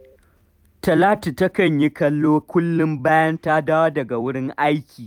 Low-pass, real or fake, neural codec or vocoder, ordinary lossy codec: 19.8 kHz; fake; vocoder, 44.1 kHz, 128 mel bands every 256 samples, BigVGAN v2; none